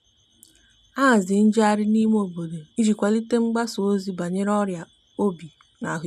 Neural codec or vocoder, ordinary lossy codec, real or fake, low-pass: none; none; real; 14.4 kHz